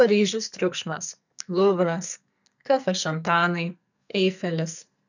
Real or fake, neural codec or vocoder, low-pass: fake; codec, 44.1 kHz, 2.6 kbps, SNAC; 7.2 kHz